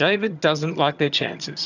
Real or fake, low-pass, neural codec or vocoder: fake; 7.2 kHz; vocoder, 22.05 kHz, 80 mel bands, HiFi-GAN